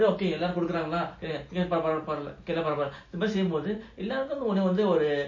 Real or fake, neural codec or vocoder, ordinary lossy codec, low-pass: real; none; MP3, 32 kbps; 7.2 kHz